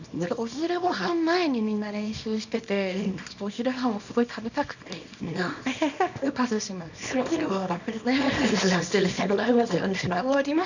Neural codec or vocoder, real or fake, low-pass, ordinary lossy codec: codec, 24 kHz, 0.9 kbps, WavTokenizer, small release; fake; 7.2 kHz; none